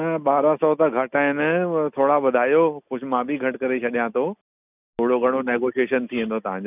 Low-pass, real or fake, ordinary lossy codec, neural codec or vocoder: 3.6 kHz; real; none; none